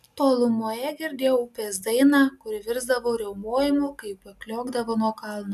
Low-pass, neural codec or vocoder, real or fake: 14.4 kHz; none; real